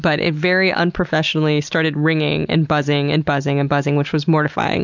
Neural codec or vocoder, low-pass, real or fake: none; 7.2 kHz; real